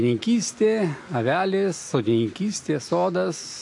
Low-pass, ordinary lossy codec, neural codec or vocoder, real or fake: 10.8 kHz; AAC, 64 kbps; none; real